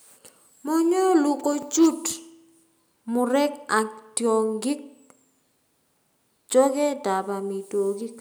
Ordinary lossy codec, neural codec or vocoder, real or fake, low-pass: none; none; real; none